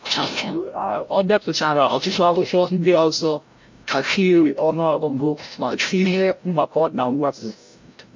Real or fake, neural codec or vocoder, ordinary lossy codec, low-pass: fake; codec, 16 kHz, 0.5 kbps, FreqCodec, larger model; MP3, 48 kbps; 7.2 kHz